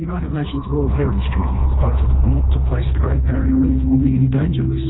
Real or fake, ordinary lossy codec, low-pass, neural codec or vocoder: fake; AAC, 16 kbps; 7.2 kHz; codec, 16 kHz, 2 kbps, FreqCodec, smaller model